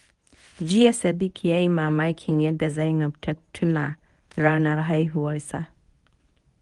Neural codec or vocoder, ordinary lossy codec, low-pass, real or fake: codec, 24 kHz, 0.9 kbps, WavTokenizer, medium speech release version 1; Opus, 24 kbps; 10.8 kHz; fake